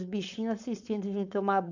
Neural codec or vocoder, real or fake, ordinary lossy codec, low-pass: codec, 16 kHz, 4.8 kbps, FACodec; fake; none; 7.2 kHz